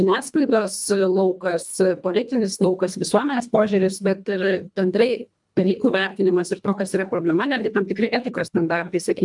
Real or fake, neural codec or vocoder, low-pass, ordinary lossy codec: fake; codec, 24 kHz, 1.5 kbps, HILCodec; 10.8 kHz; MP3, 96 kbps